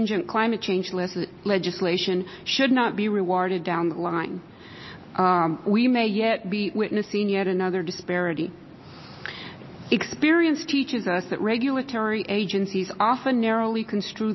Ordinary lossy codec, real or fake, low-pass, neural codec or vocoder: MP3, 24 kbps; real; 7.2 kHz; none